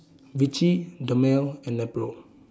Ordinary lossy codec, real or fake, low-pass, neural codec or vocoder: none; real; none; none